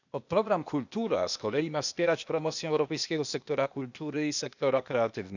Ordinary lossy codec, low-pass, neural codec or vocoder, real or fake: none; 7.2 kHz; codec, 16 kHz, 0.8 kbps, ZipCodec; fake